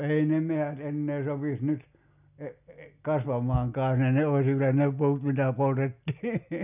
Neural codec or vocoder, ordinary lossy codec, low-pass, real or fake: none; none; 3.6 kHz; real